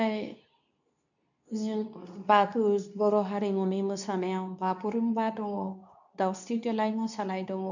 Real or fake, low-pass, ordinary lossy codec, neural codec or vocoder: fake; 7.2 kHz; none; codec, 24 kHz, 0.9 kbps, WavTokenizer, medium speech release version 2